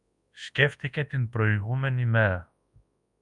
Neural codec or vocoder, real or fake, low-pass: codec, 24 kHz, 0.9 kbps, WavTokenizer, large speech release; fake; 10.8 kHz